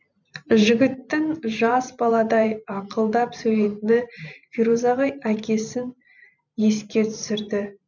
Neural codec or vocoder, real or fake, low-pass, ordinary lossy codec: vocoder, 44.1 kHz, 128 mel bands every 512 samples, BigVGAN v2; fake; 7.2 kHz; none